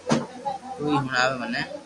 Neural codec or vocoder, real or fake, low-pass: none; real; 10.8 kHz